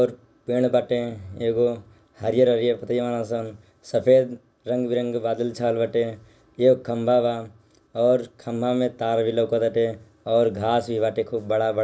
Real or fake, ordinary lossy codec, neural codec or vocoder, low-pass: real; none; none; none